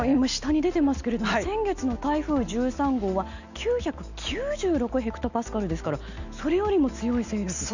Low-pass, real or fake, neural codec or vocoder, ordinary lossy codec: 7.2 kHz; real; none; none